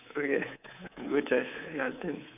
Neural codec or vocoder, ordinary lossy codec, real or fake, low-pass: codec, 24 kHz, 3.1 kbps, DualCodec; none; fake; 3.6 kHz